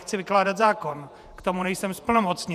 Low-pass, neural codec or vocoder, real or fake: 14.4 kHz; vocoder, 48 kHz, 128 mel bands, Vocos; fake